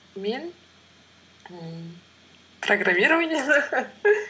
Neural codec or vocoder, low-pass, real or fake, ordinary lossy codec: none; none; real; none